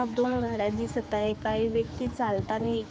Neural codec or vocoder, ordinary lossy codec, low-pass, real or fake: codec, 16 kHz, 4 kbps, X-Codec, HuBERT features, trained on general audio; none; none; fake